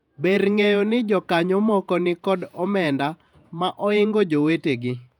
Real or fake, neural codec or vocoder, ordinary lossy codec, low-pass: fake; vocoder, 48 kHz, 128 mel bands, Vocos; none; 19.8 kHz